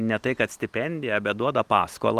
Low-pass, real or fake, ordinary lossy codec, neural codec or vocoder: 14.4 kHz; real; Opus, 32 kbps; none